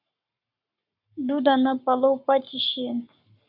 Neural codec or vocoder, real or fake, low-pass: codec, 44.1 kHz, 7.8 kbps, Pupu-Codec; fake; 5.4 kHz